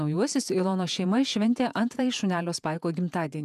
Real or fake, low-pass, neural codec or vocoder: fake; 14.4 kHz; vocoder, 48 kHz, 128 mel bands, Vocos